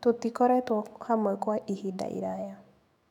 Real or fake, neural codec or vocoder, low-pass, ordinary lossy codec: fake; autoencoder, 48 kHz, 128 numbers a frame, DAC-VAE, trained on Japanese speech; 19.8 kHz; none